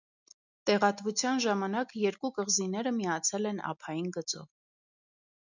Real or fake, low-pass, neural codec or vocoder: real; 7.2 kHz; none